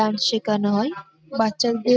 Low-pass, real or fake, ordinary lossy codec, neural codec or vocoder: none; real; none; none